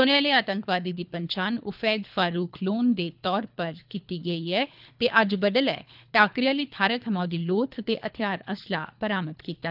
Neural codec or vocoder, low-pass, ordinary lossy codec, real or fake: codec, 24 kHz, 3 kbps, HILCodec; 5.4 kHz; none; fake